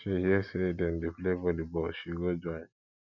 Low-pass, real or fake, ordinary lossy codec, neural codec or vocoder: 7.2 kHz; real; none; none